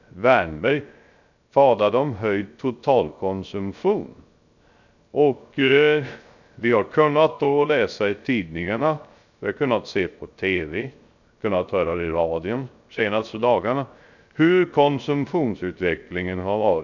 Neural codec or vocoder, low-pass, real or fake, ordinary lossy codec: codec, 16 kHz, 0.3 kbps, FocalCodec; 7.2 kHz; fake; none